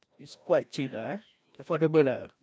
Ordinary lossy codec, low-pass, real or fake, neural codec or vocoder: none; none; fake; codec, 16 kHz, 1 kbps, FreqCodec, larger model